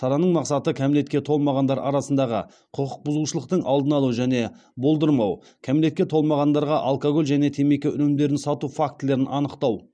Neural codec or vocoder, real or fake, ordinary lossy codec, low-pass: none; real; none; 9.9 kHz